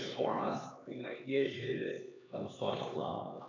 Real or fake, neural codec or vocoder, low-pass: fake; codec, 16 kHz, 2 kbps, X-Codec, HuBERT features, trained on LibriSpeech; 7.2 kHz